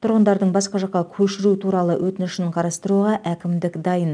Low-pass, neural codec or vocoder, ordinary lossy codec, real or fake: 9.9 kHz; none; none; real